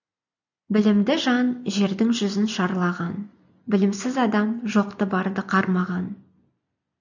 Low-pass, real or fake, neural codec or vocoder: 7.2 kHz; real; none